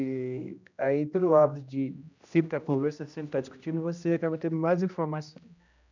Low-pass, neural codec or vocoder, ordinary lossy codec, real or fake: 7.2 kHz; codec, 16 kHz, 1 kbps, X-Codec, HuBERT features, trained on general audio; none; fake